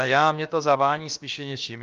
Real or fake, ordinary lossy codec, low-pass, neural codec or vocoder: fake; Opus, 24 kbps; 7.2 kHz; codec, 16 kHz, about 1 kbps, DyCAST, with the encoder's durations